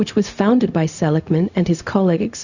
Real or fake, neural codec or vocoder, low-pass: fake; codec, 16 kHz, 0.4 kbps, LongCat-Audio-Codec; 7.2 kHz